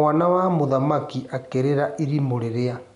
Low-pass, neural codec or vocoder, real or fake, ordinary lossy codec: 10.8 kHz; none; real; none